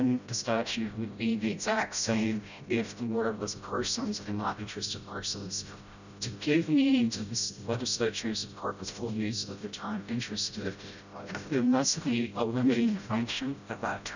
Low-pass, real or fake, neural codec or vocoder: 7.2 kHz; fake; codec, 16 kHz, 0.5 kbps, FreqCodec, smaller model